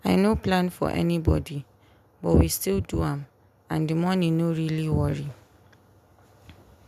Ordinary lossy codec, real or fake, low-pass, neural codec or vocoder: none; real; 14.4 kHz; none